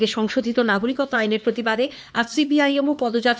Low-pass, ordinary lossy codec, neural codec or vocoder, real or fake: none; none; codec, 16 kHz, 2 kbps, X-Codec, HuBERT features, trained on LibriSpeech; fake